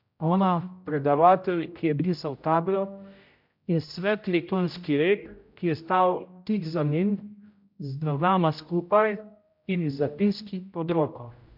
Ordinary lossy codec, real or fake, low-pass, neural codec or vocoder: MP3, 48 kbps; fake; 5.4 kHz; codec, 16 kHz, 0.5 kbps, X-Codec, HuBERT features, trained on general audio